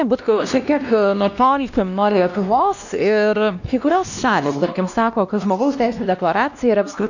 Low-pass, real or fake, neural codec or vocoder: 7.2 kHz; fake; codec, 16 kHz, 1 kbps, X-Codec, WavLM features, trained on Multilingual LibriSpeech